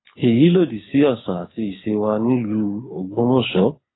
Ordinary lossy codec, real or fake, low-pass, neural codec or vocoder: AAC, 16 kbps; fake; 7.2 kHz; codec, 24 kHz, 6 kbps, HILCodec